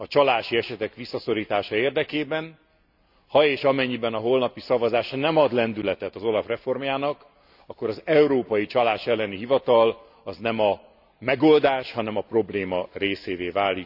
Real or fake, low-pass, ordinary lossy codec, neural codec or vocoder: real; 5.4 kHz; none; none